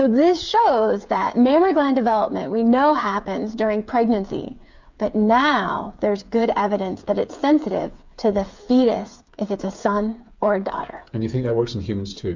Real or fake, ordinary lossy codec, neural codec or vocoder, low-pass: fake; MP3, 64 kbps; codec, 16 kHz, 8 kbps, FreqCodec, smaller model; 7.2 kHz